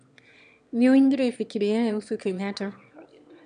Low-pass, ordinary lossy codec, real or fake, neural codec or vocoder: 9.9 kHz; none; fake; autoencoder, 22.05 kHz, a latent of 192 numbers a frame, VITS, trained on one speaker